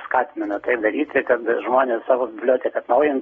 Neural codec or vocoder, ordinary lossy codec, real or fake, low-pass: none; AAC, 24 kbps; real; 9.9 kHz